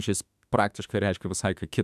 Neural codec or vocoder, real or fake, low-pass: autoencoder, 48 kHz, 32 numbers a frame, DAC-VAE, trained on Japanese speech; fake; 14.4 kHz